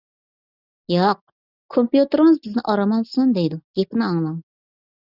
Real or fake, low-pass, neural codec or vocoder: real; 5.4 kHz; none